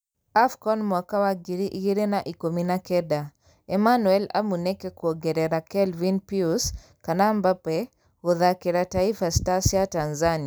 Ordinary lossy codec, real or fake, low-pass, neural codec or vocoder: none; real; none; none